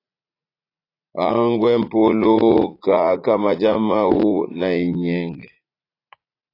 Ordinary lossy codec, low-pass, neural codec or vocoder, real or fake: AAC, 32 kbps; 5.4 kHz; vocoder, 44.1 kHz, 80 mel bands, Vocos; fake